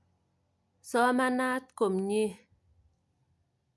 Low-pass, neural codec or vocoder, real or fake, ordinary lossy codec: none; none; real; none